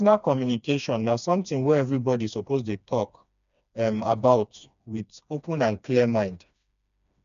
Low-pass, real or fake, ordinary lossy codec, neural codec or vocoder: 7.2 kHz; fake; none; codec, 16 kHz, 2 kbps, FreqCodec, smaller model